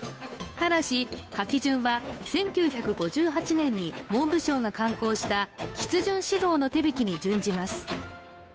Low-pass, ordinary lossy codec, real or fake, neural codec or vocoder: none; none; fake; codec, 16 kHz, 2 kbps, FunCodec, trained on Chinese and English, 25 frames a second